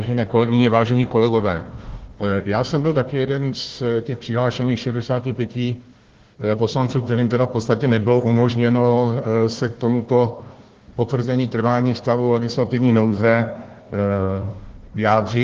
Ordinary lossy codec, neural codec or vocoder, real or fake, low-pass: Opus, 16 kbps; codec, 16 kHz, 1 kbps, FunCodec, trained on Chinese and English, 50 frames a second; fake; 7.2 kHz